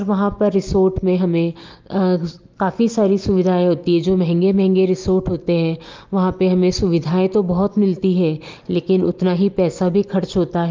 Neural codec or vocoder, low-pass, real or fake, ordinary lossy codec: none; 7.2 kHz; real; Opus, 24 kbps